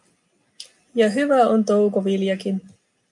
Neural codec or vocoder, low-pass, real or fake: none; 10.8 kHz; real